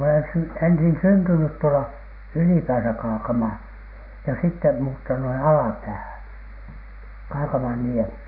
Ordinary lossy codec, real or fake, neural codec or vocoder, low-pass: none; real; none; 5.4 kHz